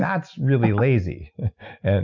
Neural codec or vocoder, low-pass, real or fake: none; 7.2 kHz; real